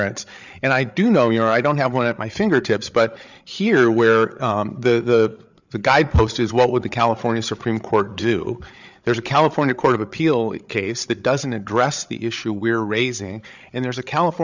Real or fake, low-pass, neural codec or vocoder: fake; 7.2 kHz; codec, 16 kHz, 8 kbps, FreqCodec, larger model